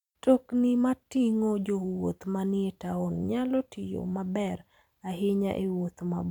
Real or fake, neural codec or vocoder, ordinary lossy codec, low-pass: fake; vocoder, 44.1 kHz, 128 mel bands every 256 samples, BigVGAN v2; none; 19.8 kHz